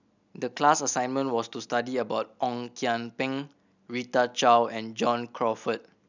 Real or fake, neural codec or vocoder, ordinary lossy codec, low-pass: real; none; none; 7.2 kHz